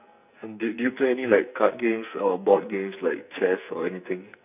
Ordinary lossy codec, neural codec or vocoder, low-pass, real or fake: none; codec, 44.1 kHz, 2.6 kbps, SNAC; 3.6 kHz; fake